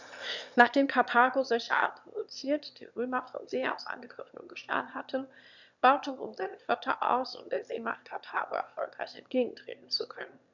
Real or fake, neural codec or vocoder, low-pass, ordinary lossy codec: fake; autoencoder, 22.05 kHz, a latent of 192 numbers a frame, VITS, trained on one speaker; 7.2 kHz; none